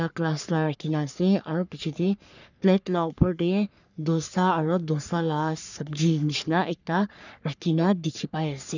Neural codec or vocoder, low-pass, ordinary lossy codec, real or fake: codec, 44.1 kHz, 3.4 kbps, Pupu-Codec; 7.2 kHz; none; fake